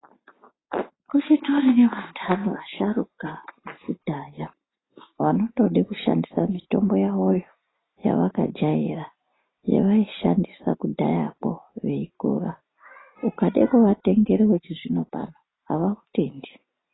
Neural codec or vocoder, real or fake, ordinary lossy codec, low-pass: none; real; AAC, 16 kbps; 7.2 kHz